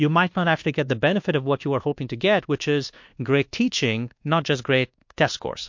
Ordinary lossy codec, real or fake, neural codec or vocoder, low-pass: MP3, 48 kbps; fake; codec, 24 kHz, 1.2 kbps, DualCodec; 7.2 kHz